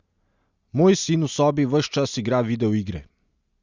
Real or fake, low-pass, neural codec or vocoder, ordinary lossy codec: real; 7.2 kHz; none; Opus, 64 kbps